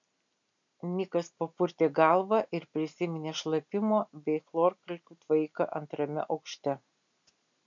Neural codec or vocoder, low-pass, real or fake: none; 7.2 kHz; real